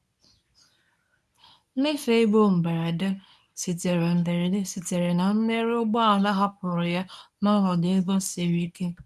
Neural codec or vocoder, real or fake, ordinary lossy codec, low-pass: codec, 24 kHz, 0.9 kbps, WavTokenizer, medium speech release version 1; fake; none; none